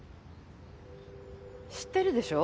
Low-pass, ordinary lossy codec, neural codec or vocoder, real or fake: none; none; none; real